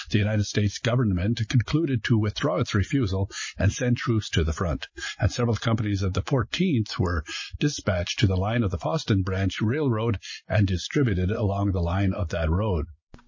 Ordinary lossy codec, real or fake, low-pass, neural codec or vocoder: MP3, 32 kbps; real; 7.2 kHz; none